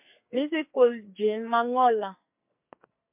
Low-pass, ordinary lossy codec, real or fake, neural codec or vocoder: 3.6 kHz; MP3, 32 kbps; fake; codec, 44.1 kHz, 2.6 kbps, SNAC